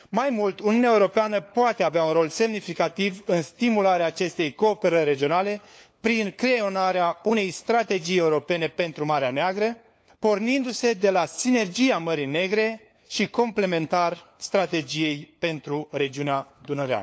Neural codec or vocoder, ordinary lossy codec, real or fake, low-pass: codec, 16 kHz, 4 kbps, FunCodec, trained on LibriTTS, 50 frames a second; none; fake; none